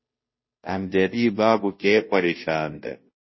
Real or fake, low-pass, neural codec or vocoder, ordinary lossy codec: fake; 7.2 kHz; codec, 16 kHz, 0.5 kbps, FunCodec, trained on Chinese and English, 25 frames a second; MP3, 24 kbps